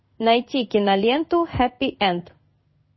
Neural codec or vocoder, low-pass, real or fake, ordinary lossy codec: codec, 16 kHz in and 24 kHz out, 1 kbps, XY-Tokenizer; 7.2 kHz; fake; MP3, 24 kbps